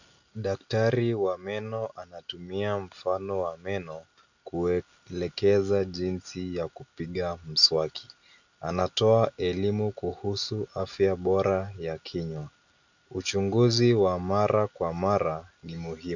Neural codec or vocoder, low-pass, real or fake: none; 7.2 kHz; real